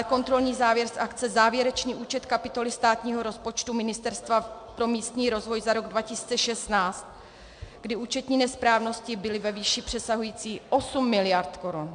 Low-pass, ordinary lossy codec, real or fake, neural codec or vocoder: 9.9 kHz; MP3, 64 kbps; real; none